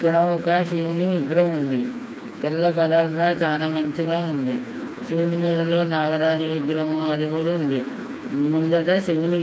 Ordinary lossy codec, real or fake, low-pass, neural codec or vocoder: none; fake; none; codec, 16 kHz, 2 kbps, FreqCodec, smaller model